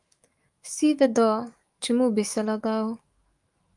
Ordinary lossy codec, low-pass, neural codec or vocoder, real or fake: Opus, 32 kbps; 10.8 kHz; autoencoder, 48 kHz, 128 numbers a frame, DAC-VAE, trained on Japanese speech; fake